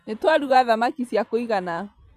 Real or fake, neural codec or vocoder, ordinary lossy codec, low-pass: fake; vocoder, 44.1 kHz, 128 mel bands every 512 samples, BigVGAN v2; none; 14.4 kHz